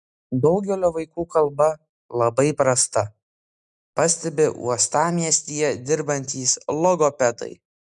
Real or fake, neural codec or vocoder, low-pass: real; none; 10.8 kHz